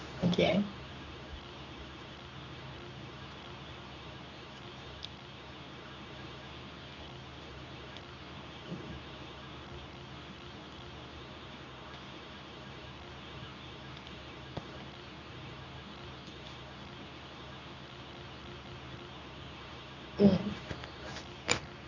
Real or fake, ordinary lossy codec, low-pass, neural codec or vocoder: fake; none; 7.2 kHz; codec, 24 kHz, 0.9 kbps, WavTokenizer, medium speech release version 2